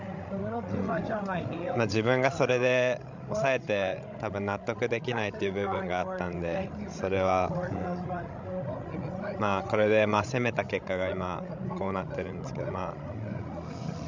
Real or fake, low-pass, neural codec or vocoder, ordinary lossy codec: fake; 7.2 kHz; codec, 16 kHz, 16 kbps, FreqCodec, larger model; MP3, 64 kbps